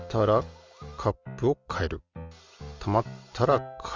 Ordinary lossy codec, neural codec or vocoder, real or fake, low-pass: Opus, 32 kbps; none; real; 7.2 kHz